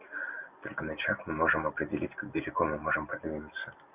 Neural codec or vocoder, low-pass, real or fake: none; 3.6 kHz; real